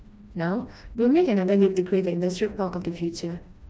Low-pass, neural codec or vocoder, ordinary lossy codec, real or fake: none; codec, 16 kHz, 1 kbps, FreqCodec, smaller model; none; fake